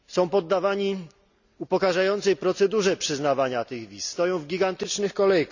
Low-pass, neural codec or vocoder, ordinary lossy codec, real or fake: 7.2 kHz; none; none; real